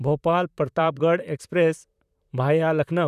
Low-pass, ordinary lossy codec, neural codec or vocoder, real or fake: 14.4 kHz; none; vocoder, 44.1 kHz, 128 mel bands, Pupu-Vocoder; fake